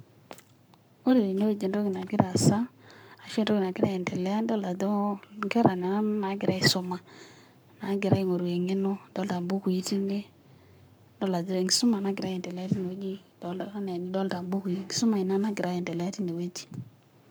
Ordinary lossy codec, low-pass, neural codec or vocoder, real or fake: none; none; codec, 44.1 kHz, 7.8 kbps, Pupu-Codec; fake